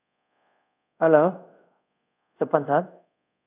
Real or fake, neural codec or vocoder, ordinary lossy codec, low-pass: fake; codec, 24 kHz, 0.5 kbps, DualCodec; none; 3.6 kHz